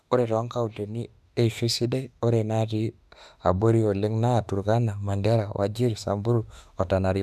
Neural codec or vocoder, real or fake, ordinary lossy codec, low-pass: autoencoder, 48 kHz, 32 numbers a frame, DAC-VAE, trained on Japanese speech; fake; none; 14.4 kHz